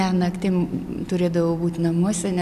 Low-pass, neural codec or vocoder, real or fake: 14.4 kHz; none; real